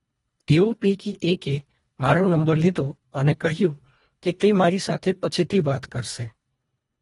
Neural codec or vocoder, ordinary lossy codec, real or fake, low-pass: codec, 24 kHz, 1.5 kbps, HILCodec; AAC, 32 kbps; fake; 10.8 kHz